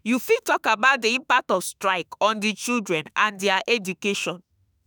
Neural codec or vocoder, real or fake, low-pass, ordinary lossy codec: autoencoder, 48 kHz, 32 numbers a frame, DAC-VAE, trained on Japanese speech; fake; none; none